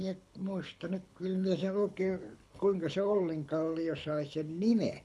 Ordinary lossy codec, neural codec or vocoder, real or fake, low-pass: none; codec, 24 kHz, 6 kbps, HILCodec; fake; none